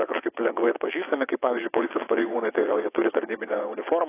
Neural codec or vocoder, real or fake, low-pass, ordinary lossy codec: vocoder, 22.05 kHz, 80 mel bands, WaveNeXt; fake; 3.6 kHz; AAC, 16 kbps